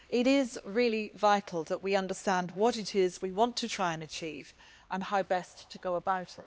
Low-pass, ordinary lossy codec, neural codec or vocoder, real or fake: none; none; codec, 16 kHz, 2 kbps, X-Codec, HuBERT features, trained on LibriSpeech; fake